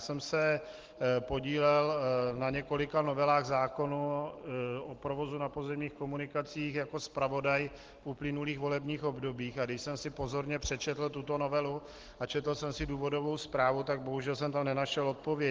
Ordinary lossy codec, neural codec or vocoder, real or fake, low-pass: Opus, 16 kbps; none; real; 7.2 kHz